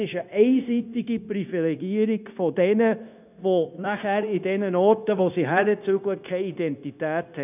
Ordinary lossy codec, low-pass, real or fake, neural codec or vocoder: none; 3.6 kHz; fake; codec, 16 kHz, 0.9 kbps, LongCat-Audio-Codec